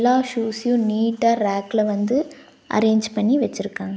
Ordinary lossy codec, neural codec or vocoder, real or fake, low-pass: none; none; real; none